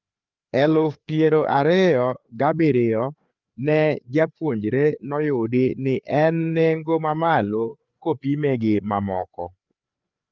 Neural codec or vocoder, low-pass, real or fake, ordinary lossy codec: codec, 16 kHz, 4 kbps, FreqCodec, larger model; 7.2 kHz; fake; Opus, 24 kbps